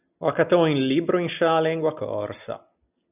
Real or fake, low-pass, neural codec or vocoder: real; 3.6 kHz; none